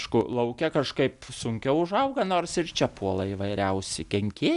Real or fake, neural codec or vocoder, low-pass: real; none; 10.8 kHz